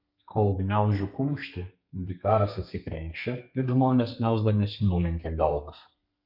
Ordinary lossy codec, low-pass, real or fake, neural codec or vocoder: MP3, 48 kbps; 5.4 kHz; fake; codec, 32 kHz, 1.9 kbps, SNAC